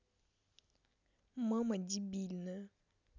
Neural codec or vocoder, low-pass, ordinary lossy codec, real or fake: none; 7.2 kHz; none; real